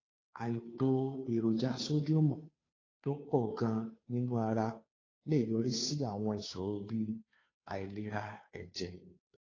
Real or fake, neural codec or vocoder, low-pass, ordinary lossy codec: fake; codec, 16 kHz, 2 kbps, FunCodec, trained on Chinese and English, 25 frames a second; 7.2 kHz; AAC, 32 kbps